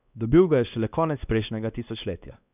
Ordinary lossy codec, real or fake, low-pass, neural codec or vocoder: none; fake; 3.6 kHz; codec, 16 kHz, 2 kbps, X-Codec, WavLM features, trained on Multilingual LibriSpeech